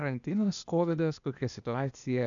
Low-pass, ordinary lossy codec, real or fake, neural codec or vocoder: 7.2 kHz; MP3, 96 kbps; fake; codec, 16 kHz, 0.8 kbps, ZipCodec